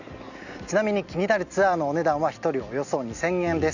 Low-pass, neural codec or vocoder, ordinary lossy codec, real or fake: 7.2 kHz; none; none; real